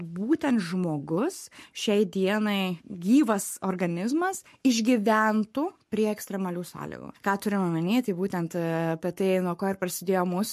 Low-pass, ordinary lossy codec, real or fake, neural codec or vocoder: 14.4 kHz; MP3, 64 kbps; fake; codec, 44.1 kHz, 7.8 kbps, Pupu-Codec